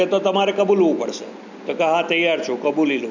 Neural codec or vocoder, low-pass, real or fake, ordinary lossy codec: none; 7.2 kHz; real; none